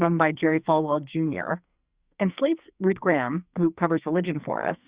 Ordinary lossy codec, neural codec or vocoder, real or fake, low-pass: Opus, 64 kbps; codec, 44.1 kHz, 2.6 kbps, SNAC; fake; 3.6 kHz